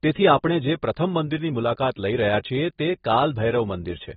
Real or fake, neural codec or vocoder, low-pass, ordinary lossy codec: real; none; 19.8 kHz; AAC, 16 kbps